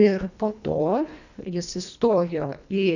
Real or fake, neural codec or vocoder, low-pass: fake; codec, 24 kHz, 1.5 kbps, HILCodec; 7.2 kHz